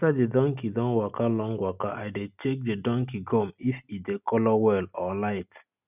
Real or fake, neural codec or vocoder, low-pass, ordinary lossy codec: real; none; 3.6 kHz; none